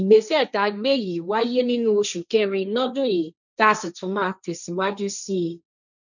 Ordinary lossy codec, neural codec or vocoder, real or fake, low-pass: none; codec, 16 kHz, 1.1 kbps, Voila-Tokenizer; fake; 7.2 kHz